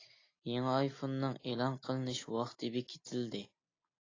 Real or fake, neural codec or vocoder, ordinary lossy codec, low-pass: real; none; AAC, 32 kbps; 7.2 kHz